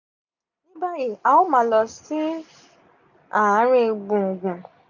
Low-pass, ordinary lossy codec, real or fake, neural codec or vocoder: 7.2 kHz; none; real; none